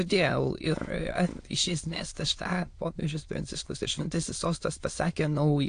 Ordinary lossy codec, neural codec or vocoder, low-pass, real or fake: AAC, 48 kbps; autoencoder, 22.05 kHz, a latent of 192 numbers a frame, VITS, trained on many speakers; 9.9 kHz; fake